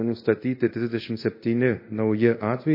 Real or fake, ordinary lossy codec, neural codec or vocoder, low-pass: fake; MP3, 24 kbps; codec, 24 kHz, 0.5 kbps, DualCodec; 5.4 kHz